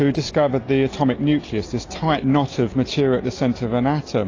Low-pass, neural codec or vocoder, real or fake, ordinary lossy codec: 7.2 kHz; none; real; AAC, 32 kbps